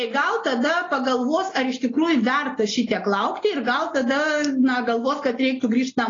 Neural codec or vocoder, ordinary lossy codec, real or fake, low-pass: none; AAC, 32 kbps; real; 7.2 kHz